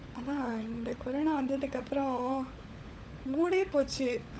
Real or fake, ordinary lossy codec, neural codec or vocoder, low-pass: fake; none; codec, 16 kHz, 16 kbps, FunCodec, trained on LibriTTS, 50 frames a second; none